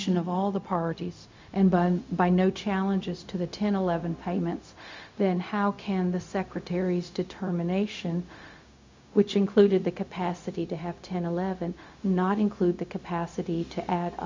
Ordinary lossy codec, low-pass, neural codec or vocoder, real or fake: MP3, 64 kbps; 7.2 kHz; codec, 16 kHz, 0.4 kbps, LongCat-Audio-Codec; fake